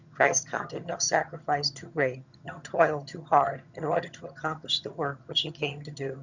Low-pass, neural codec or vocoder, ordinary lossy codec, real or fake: 7.2 kHz; vocoder, 22.05 kHz, 80 mel bands, HiFi-GAN; Opus, 64 kbps; fake